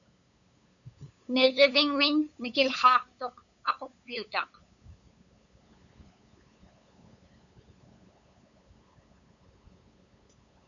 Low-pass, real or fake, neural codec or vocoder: 7.2 kHz; fake; codec, 16 kHz, 8 kbps, FunCodec, trained on LibriTTS, 25 frames a second